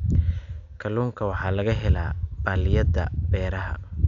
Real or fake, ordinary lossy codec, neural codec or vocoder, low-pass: real; none; none; 7.2 kHz